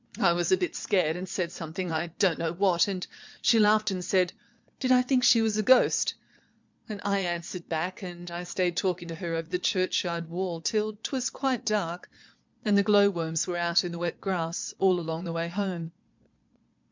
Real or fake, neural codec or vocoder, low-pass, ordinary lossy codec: fake; vocoder, 22.05 kHz, 80 mel bands, Vocos; 7.2 kHz; MP3, 64 kbps